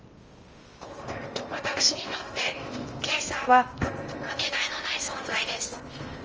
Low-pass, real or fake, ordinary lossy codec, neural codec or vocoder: 7.2 kHz; fake; Opus, 24 kbps; codec, 16 kHz in and 24 kHz out, 0.8 kbps, FocalCodec, streaming, 65536 codes